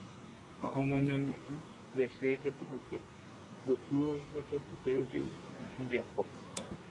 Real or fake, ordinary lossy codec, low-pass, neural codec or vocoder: fake; AAC, 32 kbps; 10.8 kHz; codec, 24 kHz, 1 kbps, SNAC